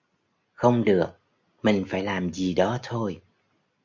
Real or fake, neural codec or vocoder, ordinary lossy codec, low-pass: real; none; MP3, 64 kbps; 7.2 kHz